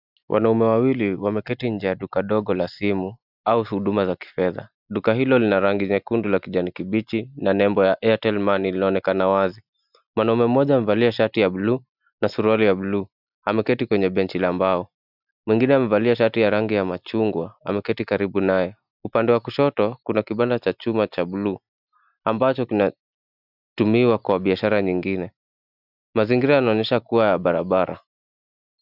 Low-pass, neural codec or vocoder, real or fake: 5.4 kHz; none; real